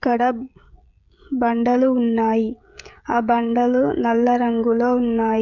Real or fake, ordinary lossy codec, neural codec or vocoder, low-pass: fake; none; codec, 16 kHz, 16 kbps, FreqCodec, smaller model; 7.2 kHz